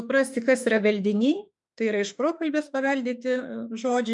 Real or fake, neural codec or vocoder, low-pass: fake; autoencoder, 48 kHz, 32 numbers a frame, DAC-VAE, trained on Japanese speech; 10.8 kHz